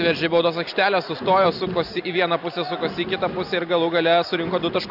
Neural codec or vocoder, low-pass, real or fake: none; 5.4 kHz; real